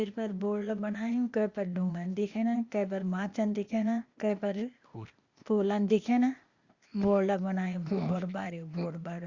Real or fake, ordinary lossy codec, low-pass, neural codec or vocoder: fake; Opus, 64 kbps; 7.2 kHz; codec, 16 kHz, 0.8 kbps, ZipCodec